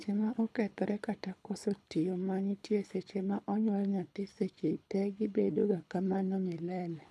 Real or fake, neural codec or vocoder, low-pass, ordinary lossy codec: fake; codec, 24 kHz, 6 kbps, HILCodec; none; none